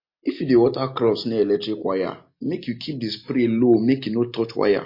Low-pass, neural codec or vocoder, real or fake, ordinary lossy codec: 5.4 kHz; none; real; MP3, 32 kbps